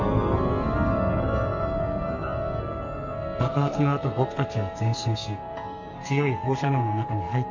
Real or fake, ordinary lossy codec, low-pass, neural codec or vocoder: fake; MP3, 48 kbps; 7.2 kHz; codec, 44.1 kHz, 2.6 kbps, SNAC